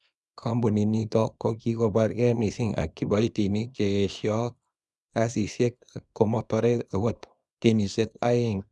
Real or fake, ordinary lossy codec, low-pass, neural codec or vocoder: fake; none; none; codec, 24 kHz, 0.9 kbps, WavTokenizer, small release